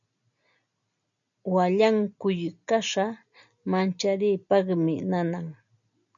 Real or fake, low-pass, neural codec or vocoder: real; 7.2 kHz; none